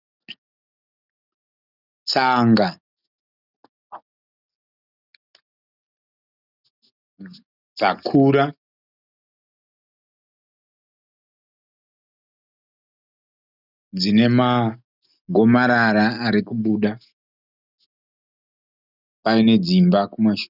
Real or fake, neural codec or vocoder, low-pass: real; none; 5.4 kHz